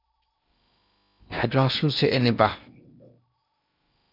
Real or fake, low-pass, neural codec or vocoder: fake; 5.4 kHz; codec, 16 kHz in and 24 kHz out, 0.8 kbps, FocalCodec, streaming, 65536 codes